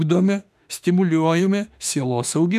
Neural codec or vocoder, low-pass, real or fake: autoencoder, 48 kHz, 32 numbers a frame, DAC-VAE, trained on Japanese speech; 14.4 kHz; fake